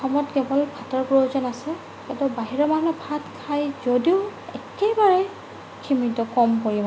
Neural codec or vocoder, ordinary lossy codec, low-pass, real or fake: none; none; none; real